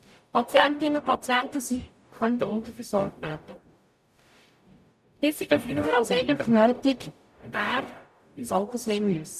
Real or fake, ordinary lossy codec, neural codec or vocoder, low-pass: fake; none; codec, 44.1 kHz, 0.9 kbps, DAC; 14.4 kHz